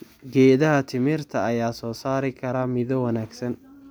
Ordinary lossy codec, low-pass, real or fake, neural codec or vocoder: none; none; real; none